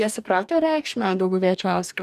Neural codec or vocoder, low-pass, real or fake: codec, 44.1 kHz, 2.6 kbps, DAC; 14.4 kHz; fake